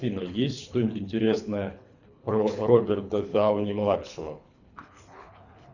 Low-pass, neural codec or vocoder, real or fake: 7.2 kHz; codec, 24 kHz, 3 kbps, HILCodec; fake